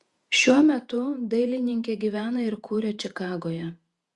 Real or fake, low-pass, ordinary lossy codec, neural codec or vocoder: fake; 10.8 kHz; Opus, 64 kbps; vocoder, 48 kHz, 128 mel bands, Vocos